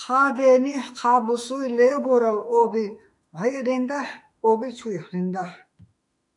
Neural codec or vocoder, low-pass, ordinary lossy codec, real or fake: autoencoder, 48 kHz, 32 numbers a frame, DAC-VAE, trained on Japanese speech; 10.8 kHz; MP3, 96 kbps; fake